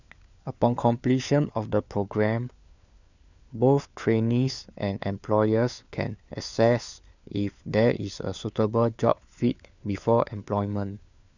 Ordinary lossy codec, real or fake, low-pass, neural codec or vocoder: none; fake; 7.2 kHz; codec, 16 kHz, 4 kbps, FunCodec, trained on LibriTTS, 50 frames a second